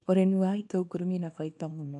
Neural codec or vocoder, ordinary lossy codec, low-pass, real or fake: codec, 24 kHz, 0.9 kbps, WavTokenizer, small release; AAC, 64 kbps; 10.8 kHz; fake